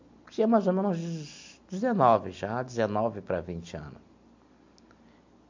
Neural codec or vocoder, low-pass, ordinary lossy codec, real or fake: none; 7.2 kHz; MP3, 48 kbps; real